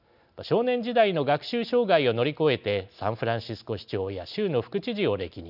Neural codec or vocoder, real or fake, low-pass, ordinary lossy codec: none; real; 5.4 kHz; none